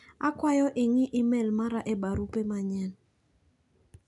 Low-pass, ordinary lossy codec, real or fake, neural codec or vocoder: 10.8 kHz; none; real; none